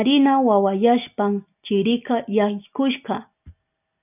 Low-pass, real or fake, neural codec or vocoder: 3.6 kHz; real; none